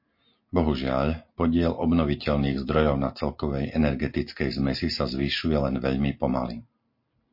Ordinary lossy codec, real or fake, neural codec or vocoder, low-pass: MP3, 32 kbps; real; none; 5.4 kHz